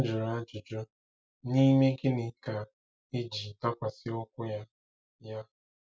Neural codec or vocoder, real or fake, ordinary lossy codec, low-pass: none; real; none; none